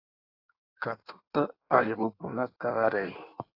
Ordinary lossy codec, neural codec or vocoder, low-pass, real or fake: AAC, 32 kbps; codec, 16 kHz in and 24 kHz out, 1.1 kbps, FireRedTTS-2 codec; 5.4 kHz; fake